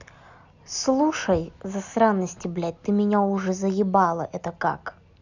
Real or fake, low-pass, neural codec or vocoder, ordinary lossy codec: real; 7.2 kHz; none; none